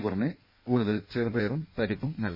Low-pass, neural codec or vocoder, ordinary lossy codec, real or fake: 5.4 kHz; codec, 16 kHz in and 24 kHz out, 1.1 kbps, FireRedTTS-2 codec; MP3, 24 kbps; fake